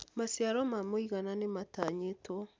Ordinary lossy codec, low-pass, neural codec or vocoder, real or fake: none; none; none; real